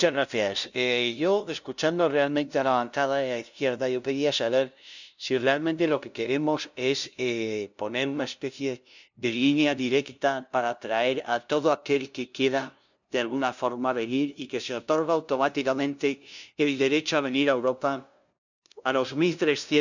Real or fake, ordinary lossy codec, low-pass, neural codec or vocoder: fake; none; 7.2 kHz; codec, 16 kHz, 0.5 kbps, FunCodec, trained on LibriTTS, 25 frames a second